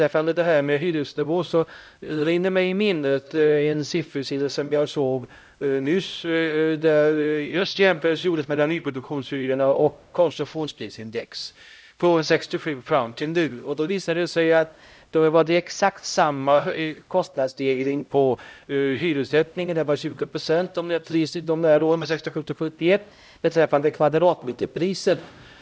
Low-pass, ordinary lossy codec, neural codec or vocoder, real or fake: none; none; codec, 16 kHz, 0.5 kbps, X-Codec, HuBERT features, trained on LibriSpeech; fake